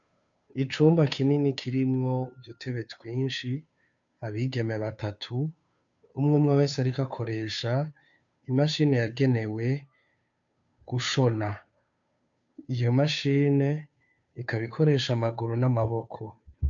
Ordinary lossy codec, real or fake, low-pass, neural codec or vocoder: MP3, 64 kbps; fake; 7.2 kHz; codec, 16 kHz, 2 kbps, FunCodec, trained on Chinese and English, 25 frames a second